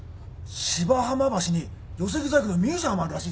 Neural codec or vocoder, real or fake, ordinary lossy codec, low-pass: none; real; none; none